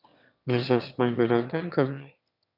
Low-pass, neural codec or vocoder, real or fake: 5.4 kHz; autoencoder, 22.05 kHz, a latent of 192 numbers a frame, VITS, trained on one speaker; fake